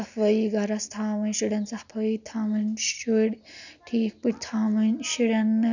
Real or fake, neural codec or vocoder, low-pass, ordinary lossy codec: real; none; 7.2 kHz; none